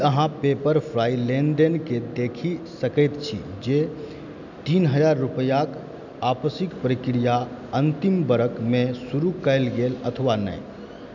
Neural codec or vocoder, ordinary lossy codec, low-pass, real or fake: none; none; 7.2 kHz; real